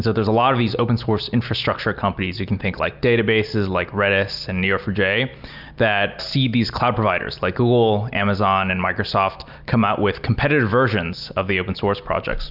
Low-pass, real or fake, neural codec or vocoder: 5.4 kHz; real; none